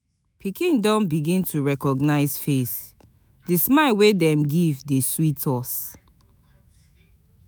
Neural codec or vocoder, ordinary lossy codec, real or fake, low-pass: autoencoder, 48 kHz, 128 numbers a frame, DAC-VAE, trained on Japanese speech; none; fake; none